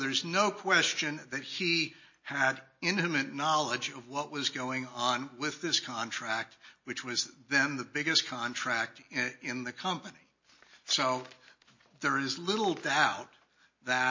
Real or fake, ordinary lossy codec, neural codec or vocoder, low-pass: real; MP3, 32 kbps; none; 7.2 kHz